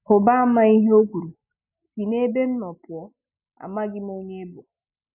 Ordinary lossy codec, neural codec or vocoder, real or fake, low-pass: none; none; real; 3.6 kHz